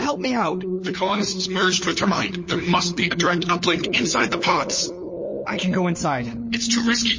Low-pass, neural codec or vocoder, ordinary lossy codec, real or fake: 7.2 kHz; codec, 16 kHz, 4 kbps, FunCodec, trained on LibriTTS, 50 frames a second; MP3, 32 kbps; fake